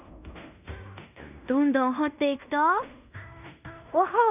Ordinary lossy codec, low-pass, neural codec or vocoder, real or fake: none; 3.6 kHz; codec, 16 kHz in and 24 kHz out, 0.9 kbps, LongCat-Audio-Codec, fine tuned four codebook decoder; fake